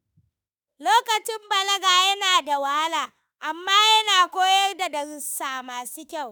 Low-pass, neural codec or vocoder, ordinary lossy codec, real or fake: none; autoencoder, 48 kHz, 32 numbers a frame, DAC-VAE, trained on Japanese speech; none; fake